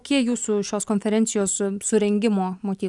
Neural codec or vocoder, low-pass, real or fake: none; 10.8 kHz; real